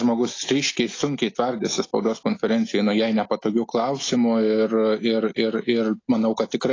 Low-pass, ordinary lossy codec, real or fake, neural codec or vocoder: 7.2 kHz; AAC, 32 kbps; real; none